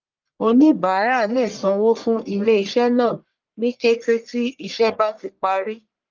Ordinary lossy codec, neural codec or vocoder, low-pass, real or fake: Opus, 24 kbps; codec, 44.1 kHz, 1.7 kbps, Pupu-Codec; 7.2 kHz; fake